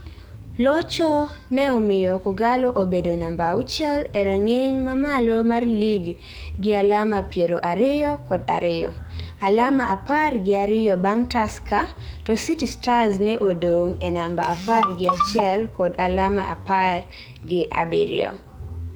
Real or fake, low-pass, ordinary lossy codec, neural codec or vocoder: fake; none; none; codec, 44.1 kHz, 2.6 kbps, SNAC